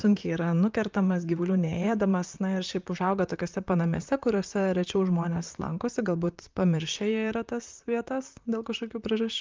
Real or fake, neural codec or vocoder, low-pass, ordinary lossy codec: fake; vocoder, 44.1 kHz, 128 mel bands, Pupu-Vocoder; 7.2 kHz; Opus, 24 kbps